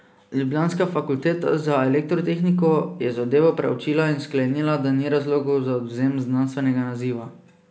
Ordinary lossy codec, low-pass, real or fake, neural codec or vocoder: none; none; real; none